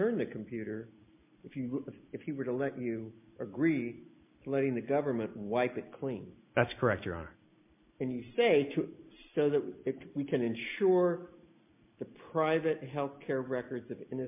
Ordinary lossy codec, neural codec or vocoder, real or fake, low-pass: MP3, 32 kbps; none; real; 3.6 kHz